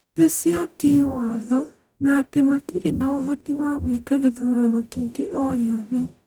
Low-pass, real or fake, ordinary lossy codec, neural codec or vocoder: none; fake; none; codec, 44.1 kHz, 0.9 kbps, DAC